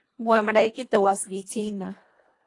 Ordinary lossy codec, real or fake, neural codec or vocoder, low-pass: AAC, 48 kbps; fake; codec, 24 kHz, 1.5 kbps, HILCodec; 10.8 kHz